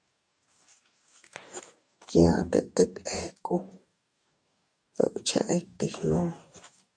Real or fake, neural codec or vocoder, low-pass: fake; codec, 44.1 kHz, 2.6 kbps, DAC; 9.9 kHz